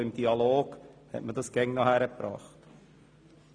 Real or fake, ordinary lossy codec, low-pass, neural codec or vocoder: real; none; 9.9 kHz; none